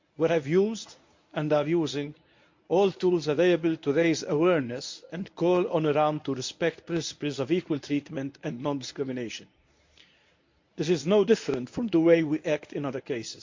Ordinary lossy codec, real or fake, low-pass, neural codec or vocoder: MP3, 48 kbps; fake; 7.2 kHz; codec, 24 kHz, 0.9 kbps, WavTokenizer, medium speech release version 1